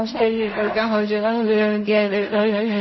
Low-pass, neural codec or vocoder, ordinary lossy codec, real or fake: 7.2 kHz; codec, 16 kHz in and 24 kHz out, 0.4 kbps, LongCat-Audio-Codec, fine tuned four codebook decoder; MP3, 24 kbps; fake